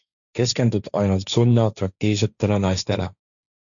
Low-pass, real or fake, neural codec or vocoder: 7.2 kHz; fake; codec, 16 kHz, 1.1 kbps, Voila-Tokenizer